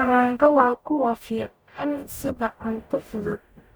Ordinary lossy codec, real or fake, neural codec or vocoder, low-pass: none; fake; codec, 44.1 kHz, 0.9 kbps, DAC; none